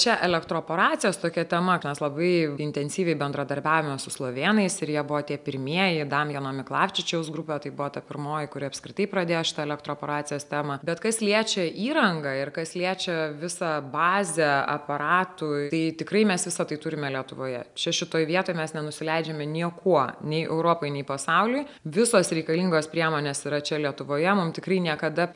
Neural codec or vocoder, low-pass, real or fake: none; 10.8 kHz; real